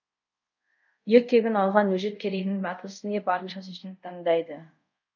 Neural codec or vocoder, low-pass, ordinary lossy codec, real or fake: codec, 24 kHz, 0.5 kbps, DualCodec; 7.2 kHz; none; fake